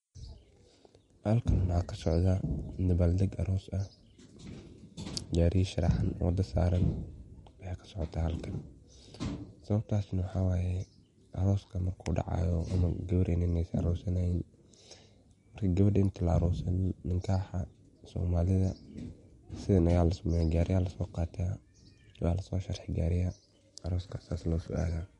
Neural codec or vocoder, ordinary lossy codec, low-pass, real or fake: none; MP3, 48 kbps; 19.8 kHz; real